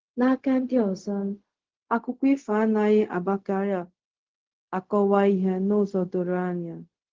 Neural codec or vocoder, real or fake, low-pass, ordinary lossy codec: codec, 16 kHz, 0.4 kbps, LongCat-Audio-Codec; fake; 7.2 kHz; Opus, 16 kbps